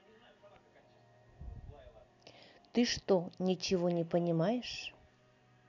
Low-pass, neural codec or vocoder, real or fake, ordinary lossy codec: 7.2 kHz; none; real; none